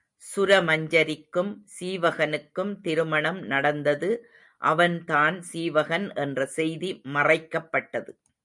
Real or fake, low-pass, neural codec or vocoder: real; 10.8 kHz; none